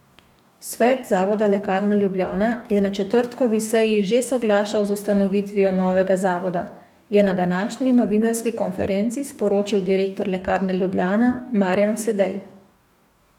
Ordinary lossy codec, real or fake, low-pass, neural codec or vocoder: none; fake; 19.8 kHz; codec, 44.1 kHz, 2.6 kbps, DAC